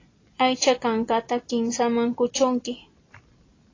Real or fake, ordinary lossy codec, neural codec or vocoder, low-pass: real; AAC, 32 kbps; none; 7.2 kHz